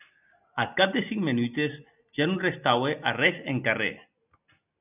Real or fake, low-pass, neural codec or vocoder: real; 3.6 kHz; none